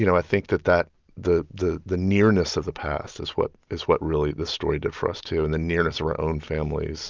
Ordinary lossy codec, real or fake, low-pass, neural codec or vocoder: Opus, 24 kbps; real; 7.2 kHz; none